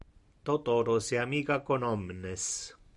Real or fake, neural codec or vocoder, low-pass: real; none; 10.8 kHz